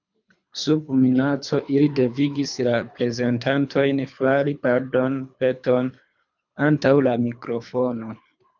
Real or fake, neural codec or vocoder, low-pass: fake; codec, 24 kHz, 3 kbps, HILCodec; 7.2 kHz